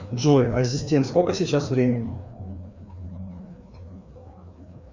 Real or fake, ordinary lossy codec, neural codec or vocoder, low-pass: fake; Opus, 64 kbps; codec, 16 kHz, 2 kbps, FreqCodec, larger model; 7.2 kHz